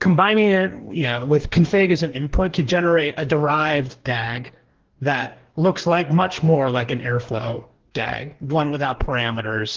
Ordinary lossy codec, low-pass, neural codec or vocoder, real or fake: Opus, 16 kbps; 7.2 kHz; codec, 44.1 kHz, 2.6 kbps, DAC; fake